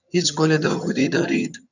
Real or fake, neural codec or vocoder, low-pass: fake; vocoder, 22.05 kHz, 80 mel bands, HiFi-GAN; 7.2 kHz